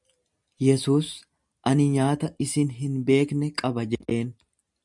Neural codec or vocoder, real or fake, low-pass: none; real; 10.8 kHz